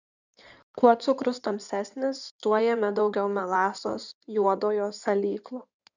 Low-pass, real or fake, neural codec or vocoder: 7.2 kHz; fake; codec, 16 kHz in and 24 kHz out, 2.2 kbps, FireRedTTS-2 codec